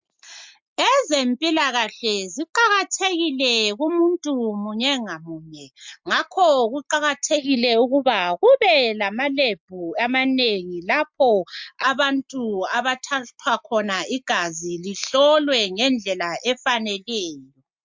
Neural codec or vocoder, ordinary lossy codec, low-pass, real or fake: none; MP3, 64 kbps; 7.2 kHz; real